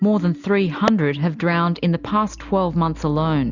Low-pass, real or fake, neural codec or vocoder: 7.2 kHz; real; none